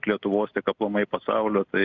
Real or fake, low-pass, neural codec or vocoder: real; 7.2 kHz; none